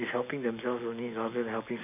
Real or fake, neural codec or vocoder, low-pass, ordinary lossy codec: real; none; 3.6 kHz; none